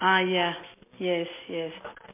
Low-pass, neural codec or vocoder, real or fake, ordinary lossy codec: 3.6 kHz; none; real; MP3, 24 kbps